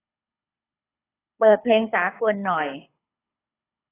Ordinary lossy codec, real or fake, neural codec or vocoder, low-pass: AAC, 16 kbps; fake; codec, 24 kHz, 6 kbps, HILCodec; 3.6 kHz